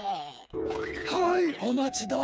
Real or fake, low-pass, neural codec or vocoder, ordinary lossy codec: fake; none; codec, 16 kHz, 4 kbps, FreqCodec, smaller model; none